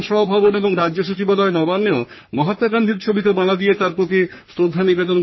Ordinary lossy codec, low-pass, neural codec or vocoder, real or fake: MP3, 24 kbps; 7.2 kHz; codec, 44.1 kHz, 3.4 kbps, Pupu-Codec; fake